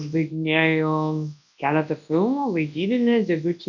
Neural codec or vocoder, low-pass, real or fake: codec, 24 kHz, 0.9 kbps, WavTokenizer, large speech release; 7.2 kHz; fake